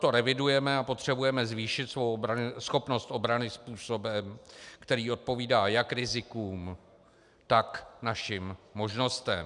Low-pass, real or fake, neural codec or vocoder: 10.8 kHz; real; none